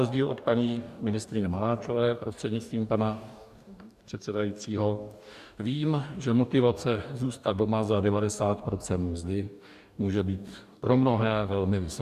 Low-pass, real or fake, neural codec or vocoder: 14.4 kHz; fake; codec, 44.1 kHz, 2.6 kbps, DAC